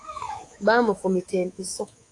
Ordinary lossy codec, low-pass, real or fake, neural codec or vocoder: AAC, 48 kbps; 10.8 kHz; fake; codec, 24 kHz, 3.1 kbps, DualCodec